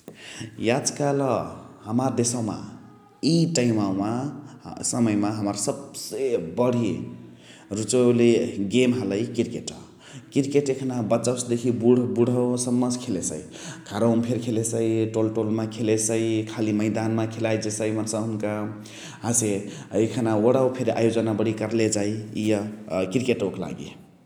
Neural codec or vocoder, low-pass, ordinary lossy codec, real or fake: none; 19.8 kHz; none; real